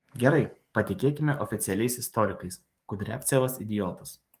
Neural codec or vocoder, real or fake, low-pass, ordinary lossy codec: codec, 44.1 kHz, 7.8 kbps, DAC; fake; 14.4 kHz; Opus, 32 kbps